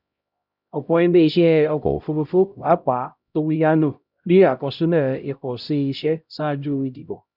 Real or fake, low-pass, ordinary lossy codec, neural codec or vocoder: fake; 5.4 kHz; none; codec, 16 kHz, 0.5 kbps, X-Codec, HuBERT features, trained on LibriSpeech